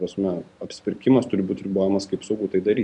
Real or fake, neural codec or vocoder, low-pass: real; none; 10.8 kHz